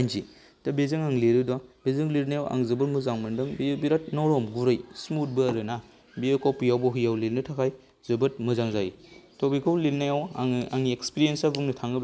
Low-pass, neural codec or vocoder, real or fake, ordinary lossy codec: none; none; real; none